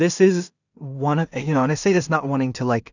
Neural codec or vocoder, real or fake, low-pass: codec, 16 kHz in and 24 kHz out, 0.4 kbps, LongCat-Audio-Codec, two codebook decoder; fake; 7.2 kHz